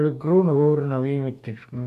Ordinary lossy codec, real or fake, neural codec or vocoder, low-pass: none; fake; codec, 44.1 kHz, 2.6 kbps, DAC; 14.4 kHz